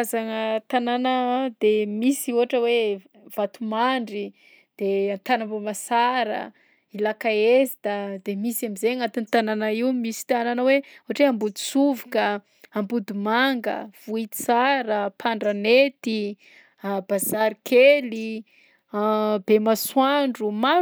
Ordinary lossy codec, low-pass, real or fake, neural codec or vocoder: none; none; real; none